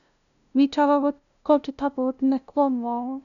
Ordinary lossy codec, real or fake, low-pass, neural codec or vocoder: none; fake; 7.2 kHz; codec, 16 kHz, 0.5 kbps, FunCodec, trained on LibriTTS, 25 frames a second